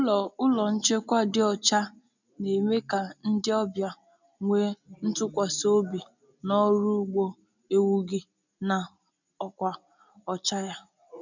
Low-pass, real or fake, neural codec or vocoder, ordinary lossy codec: 7.2 kHz; real; none; none